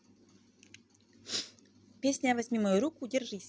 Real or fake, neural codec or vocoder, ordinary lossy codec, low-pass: real; none; none; none